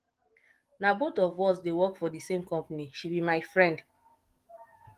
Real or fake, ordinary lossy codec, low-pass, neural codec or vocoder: fake; Opus, 24 kbps; 14.4 kHz; codec, 44.1 kHz, 7.8 kbps, DAC